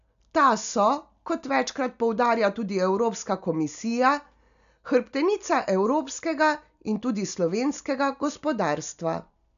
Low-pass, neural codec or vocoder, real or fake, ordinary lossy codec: 7.2 kHz; none; real; none